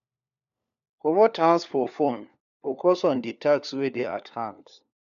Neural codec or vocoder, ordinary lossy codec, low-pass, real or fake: codec, 16 kHz, 4 kbps, FunCodec, trained on LibriTTS, 50 frames a second; none; 7.2 kHz; fake